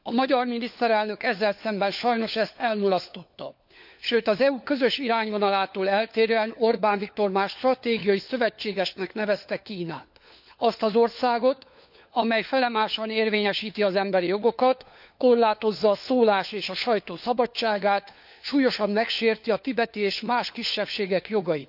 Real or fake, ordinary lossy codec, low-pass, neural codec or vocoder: fake; none; 5.4 kHz; codec, 16 kHz, 4 kbps, FunCodec, trained on LibriTTS, 50 frames a second